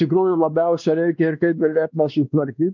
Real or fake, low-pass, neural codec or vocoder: fake; 7.2 kHz; codec, 16 kHz, 2 kbps, X-Codec, WavLM features, trained on Multilingual LibriSpeech